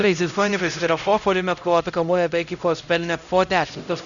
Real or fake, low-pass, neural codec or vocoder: fake; 7.2 kHz; codec, 16 kHz, 0.5 kbps, X-Codec, HuBERT features, trained on LibriSpeech